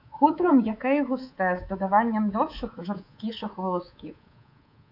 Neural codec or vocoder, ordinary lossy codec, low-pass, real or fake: codec, 24 kHz, 3.1 kbps, DualCodec; AAC, 48 kbps; 5.4 kHz; fake